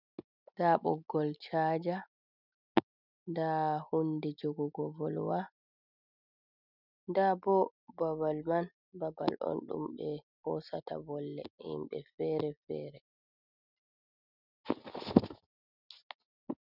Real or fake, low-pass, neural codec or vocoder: real; 5.4 kHz; none